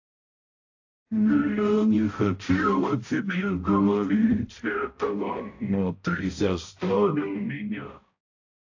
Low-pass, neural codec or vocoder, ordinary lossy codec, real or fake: 7.2 kHz; codec, 16 kHz, 0.5 kbps, X-Codec, HuBERT features, trained on balanced general audio; AAC, 48 kbps; fake